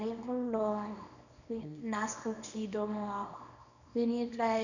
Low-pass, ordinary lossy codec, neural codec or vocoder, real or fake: 7.2 kHz; none; codec, 24 kHz, 0.9 kbps, WavTokenizer, small release; fake